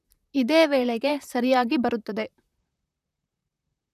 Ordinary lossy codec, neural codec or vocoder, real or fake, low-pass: none; vocoder, 44.1 kHz, 128 mel bands, Pupu-Vocoder; fake; 14.4 kHz